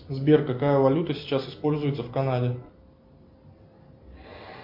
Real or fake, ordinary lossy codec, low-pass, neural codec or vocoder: real; MP3, 48 kbps; 5.4 kHz; none